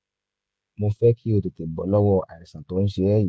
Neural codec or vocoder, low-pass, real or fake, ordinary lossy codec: codec, 16 kHz, 16 kbps, FreqCodec, smaller model; none; fake; none